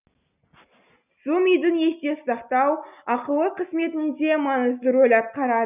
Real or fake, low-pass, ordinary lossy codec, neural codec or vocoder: real; 3.6 kHz; none; none